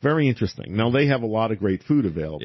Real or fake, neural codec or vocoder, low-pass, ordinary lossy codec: real; none; 7.2 kHz; MP3, 24 kbps